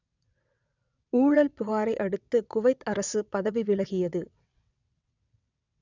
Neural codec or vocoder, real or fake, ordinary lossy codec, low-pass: vocoder, 22.05 kHz, 80 mel bands, WaveNeXt; fake; none; 7.2 kHz